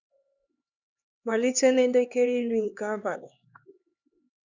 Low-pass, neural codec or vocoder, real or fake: 7.2 kHz; codec, 16 kHz, 2 kbps, X-Codec, HuBERT features, trained on LibriSpeech; fake